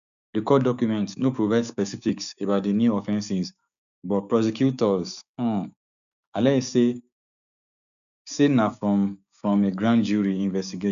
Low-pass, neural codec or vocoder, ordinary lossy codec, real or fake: 7.2 kHz; codec, 16 kHz, 6 kbps, DAC; none; fake